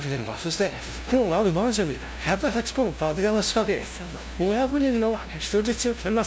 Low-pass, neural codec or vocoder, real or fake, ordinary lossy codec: none; codec, 16 kHz, 0.5 kbps, FunCodec, trained on LibriTTS, 25 frames a second; fake; none